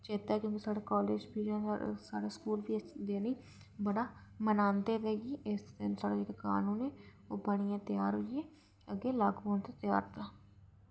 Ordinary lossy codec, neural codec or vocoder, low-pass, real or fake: none; none; none; real